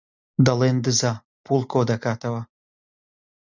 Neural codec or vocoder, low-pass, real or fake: none; 7.2 kHz; real